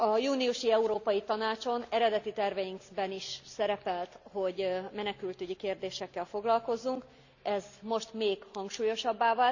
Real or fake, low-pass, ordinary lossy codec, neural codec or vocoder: real; 7.2 kHz; none; none